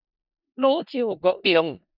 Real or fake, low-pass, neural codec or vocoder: fake; 5.4 kHz; codec, 16 kHz in and 24 kHz out, 0.4 kbps, LongCat-Audio-Codec, four codebook decoder